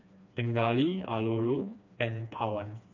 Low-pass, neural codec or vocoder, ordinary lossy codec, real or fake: 7.2 kHz; codec, 16 kHz, 2 kbps, FreqCodec, smaller model; MP3, 64 kbps; fake